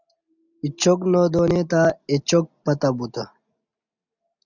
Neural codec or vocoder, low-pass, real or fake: none; 7.2 kHz; real